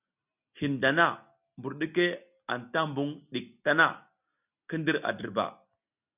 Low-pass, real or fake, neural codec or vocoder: 3.6 kHz; real; none